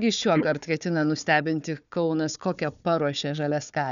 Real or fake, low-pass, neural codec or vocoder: fake; 7.2 kHz; codec, 16 kHz, 4 kbps, FunCodec, trained on Chinese and English, 50 frames a second